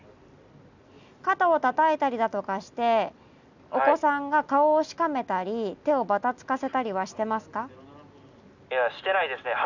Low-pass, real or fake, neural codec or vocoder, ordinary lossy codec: 7.2 kHz; real; none; none